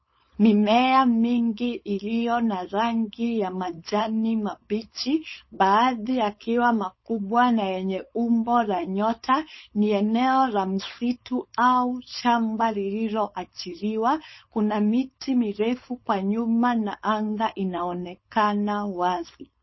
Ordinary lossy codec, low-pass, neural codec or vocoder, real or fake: MP3, 24 kbps; 7.2 kHz; codec, 16 kHz, 4.8 kbps, FACodec; fake